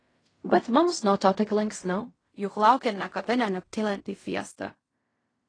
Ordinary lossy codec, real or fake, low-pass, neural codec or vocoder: AAC, 32 kbps; fake; 9.9 kHz; codec, 16 kHz in and 24 kHz out, 0.4 kbps, LongCat-Audio-Codec, fine tuned four codebook decoder